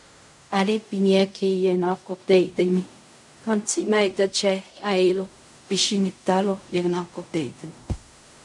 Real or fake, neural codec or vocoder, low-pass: fake; codec, 16 kHz in and 24 kHz out, 0.4 kbps, LongCat-Audio-Codec, fine tuned four codebook decoder; 10.8 kHz